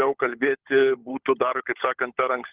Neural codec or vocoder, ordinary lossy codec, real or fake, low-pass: codec, 16 kHz, 16 kbps, FunCodec, trained on LibriTTS, 50 frames a second; Opus, 16 kbps; fake; 3.6 kHz